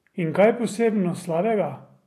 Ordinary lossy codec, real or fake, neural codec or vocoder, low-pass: AAC, 64 kbps; real; none; 14.4 kHz